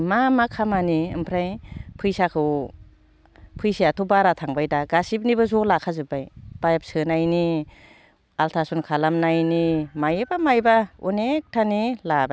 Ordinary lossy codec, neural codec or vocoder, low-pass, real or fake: none; none; none; real